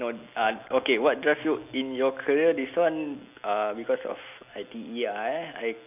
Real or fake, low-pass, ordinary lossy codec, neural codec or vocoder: real; 3.6 kHz; none; none